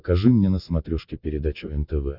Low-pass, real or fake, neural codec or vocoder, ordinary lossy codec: 5.4 kHz; real; none; Opus, 64 kbps